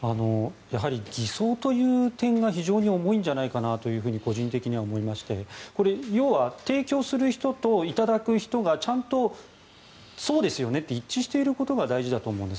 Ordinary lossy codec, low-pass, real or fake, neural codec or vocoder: none; none; real; none